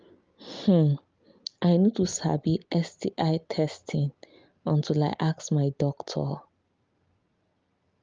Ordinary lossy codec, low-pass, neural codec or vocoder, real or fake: Opus, 24 kbps; 7.2 kHz; none; real